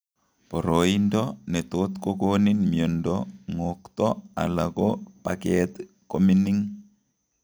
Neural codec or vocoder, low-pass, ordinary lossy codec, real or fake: none; none; none; real